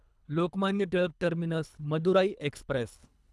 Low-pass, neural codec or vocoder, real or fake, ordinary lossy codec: none; codec, 24 kHz, 3 kbps, HILCodec; fake; none